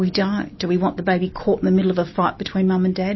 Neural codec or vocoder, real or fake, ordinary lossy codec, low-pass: none; real; MP3, 24 kbps; 7.2 kHz